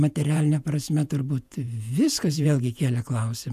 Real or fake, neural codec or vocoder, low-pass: fake; vocoder, 48 kHz, 128 mel bands, Vocos; 14.4 kHz